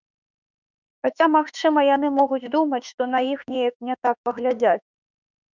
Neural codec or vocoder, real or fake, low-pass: autoencoder, 48 kHz, 32 numbers a frame, DAC-VAE, trained on Japanese speech; fake; 7.2 kHz